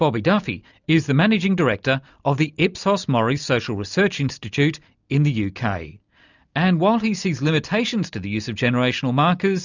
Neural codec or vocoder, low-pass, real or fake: none; 7.2 kHz; real